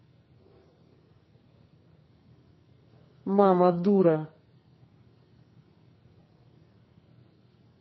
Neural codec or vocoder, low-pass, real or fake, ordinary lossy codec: codec, 44.1 kHz, 2.6 kbps, SNAC; 7.2 kHz; fake; MP3, 24 kbps